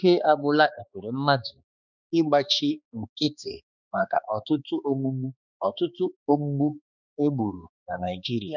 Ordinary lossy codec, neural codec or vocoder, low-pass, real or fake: none; codec, 16 kHz, 2 kbps, X-Codec, HuBERT features, trained on balanced general audio; 7.2 kHz; fake